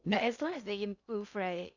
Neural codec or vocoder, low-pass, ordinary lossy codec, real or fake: codec, 16 kHz in and 24 kHz out, 0.6 kbps, FocalCodec, streaming, 2048 codes; 7.2 kHz; none; fake